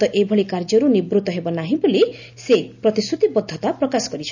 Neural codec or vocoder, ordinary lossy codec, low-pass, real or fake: none; none; 7.2 kHz; real